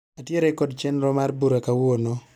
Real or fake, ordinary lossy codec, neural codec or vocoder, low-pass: real; none; none; 19.8 kHz